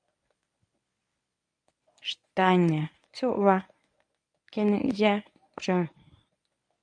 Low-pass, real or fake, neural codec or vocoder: 9.9 kHz; fake; codec, 24 kHz, 0.9 kbps, WavTokenizer, medium speech release version 1